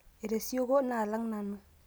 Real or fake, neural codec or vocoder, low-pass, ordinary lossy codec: real; none; none; none